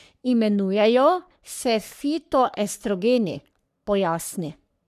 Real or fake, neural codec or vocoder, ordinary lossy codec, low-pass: fake; codec, 44.1 kHz, 3.4 kbps, Pupu-Codec; none; 14.4 kHz